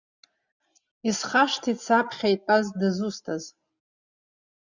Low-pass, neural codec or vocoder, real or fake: 7.2 kHz; none; real